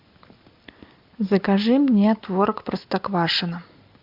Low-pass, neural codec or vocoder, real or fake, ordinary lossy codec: 5.4 kHz; none; real; MP3, 48 kbps